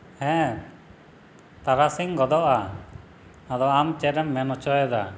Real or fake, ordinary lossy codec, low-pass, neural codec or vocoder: real; none; none; none